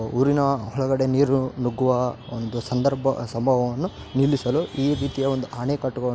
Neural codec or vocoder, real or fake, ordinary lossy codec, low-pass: none; real; none; none